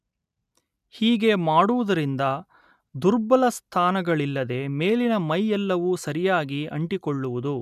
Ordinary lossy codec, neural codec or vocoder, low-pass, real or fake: none; none; 14.4 kHz; real